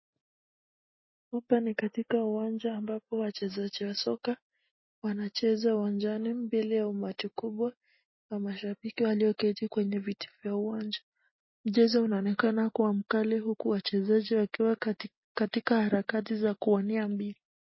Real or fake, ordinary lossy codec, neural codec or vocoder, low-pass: real; MP3, 24 kbps; none; 7.2 kHz